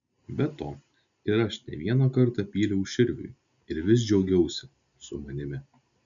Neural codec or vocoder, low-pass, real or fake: none; 7.2 kHz; real